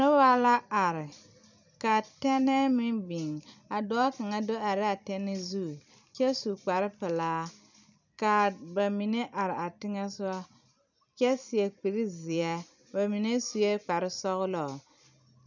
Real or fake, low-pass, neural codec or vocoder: fake; 7.2 kHz; vocoder, 44.1 kHz, 128 mel bands every 256 samples, BigVGAN v2